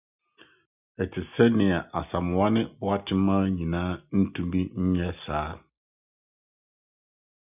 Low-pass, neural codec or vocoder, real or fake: 3.6 kHz; none; real